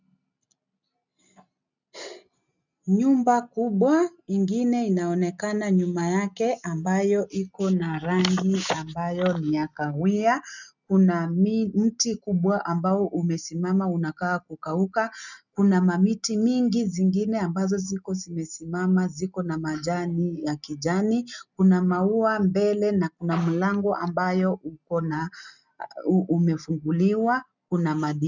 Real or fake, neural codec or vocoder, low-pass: real; none; 7.2 kHz